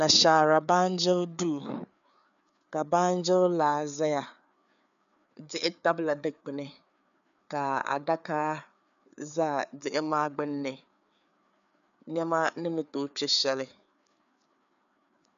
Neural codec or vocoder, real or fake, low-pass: codec, 16 kHz, 4 kbps, FreqCodec, larger model; fake; 7.2 kHz